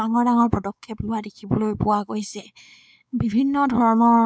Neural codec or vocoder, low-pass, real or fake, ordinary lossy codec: codec, 16 kHz, 4 kbps, X-Codec, WavLM features, trained on Multilingual LibriSpeech; none; fake; none